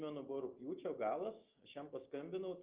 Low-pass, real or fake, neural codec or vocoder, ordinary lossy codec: 3.6 kHz; real; none; Opus, 32 kbps